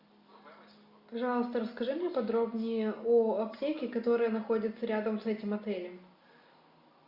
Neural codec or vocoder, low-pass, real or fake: none; 5.4 kHz; real